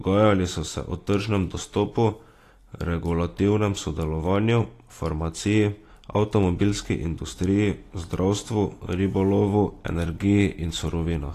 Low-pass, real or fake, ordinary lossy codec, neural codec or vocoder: 14.4 kHz; real; AAC, 48 kbps; none